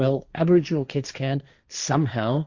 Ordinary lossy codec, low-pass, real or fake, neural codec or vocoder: Opus, 64 kbps; 7.2 kHz; fake; codec, 16 kHz, 1.1 kbps, Voila-Tokenizer